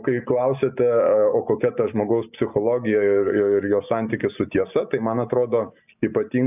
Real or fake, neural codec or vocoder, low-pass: real; none; 3.6 kHz